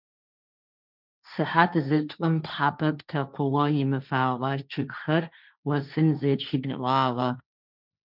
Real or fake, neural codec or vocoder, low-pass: fake; codec, 16 kHz, 1.1 kbps, Voila-Tokenizer; 5.4 kHz